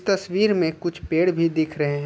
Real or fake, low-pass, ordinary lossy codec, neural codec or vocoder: real; none; none; none